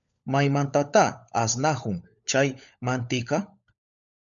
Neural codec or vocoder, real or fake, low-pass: codec, 16 kHz, 16 kbps, FunCodec, trained on LibriTTS, 50 frames a second; fake; 7.2 kHz